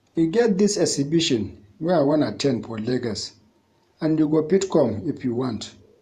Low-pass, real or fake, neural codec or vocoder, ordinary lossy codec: 14.4 kHz; fake; vocoder, 48 kHz, 128 mel bands, Vocos; Opus, 64 kbps